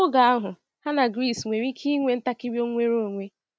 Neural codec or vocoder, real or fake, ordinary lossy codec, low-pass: none; real; none; none